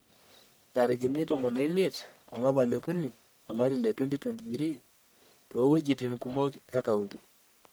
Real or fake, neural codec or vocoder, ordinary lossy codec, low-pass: fake; codec, 44.1 kHz, 1.7 kbps, Pupu-Codec; none; none